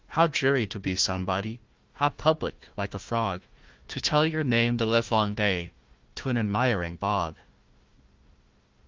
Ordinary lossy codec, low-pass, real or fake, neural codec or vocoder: Opus, 32 kbps; 7.2 kHz; fake; codec, 16 kHz, 1 kbps, FunCodec, trained on Chinese and English, 50 frames a second